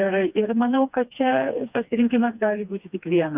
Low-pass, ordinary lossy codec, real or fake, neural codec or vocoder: 3.6 kHz; Opus, 64 kbps; fake; codec, 16 kHz, 2 kbps, FreqCodec, smaller model